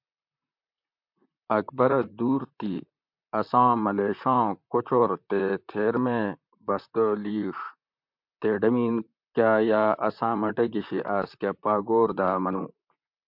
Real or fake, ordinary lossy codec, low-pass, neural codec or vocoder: fake; MP3, 48 kbps; 5.4 kHz; vocoder, 44.1 kHz, 128 mel bands, Pupu-Vocoder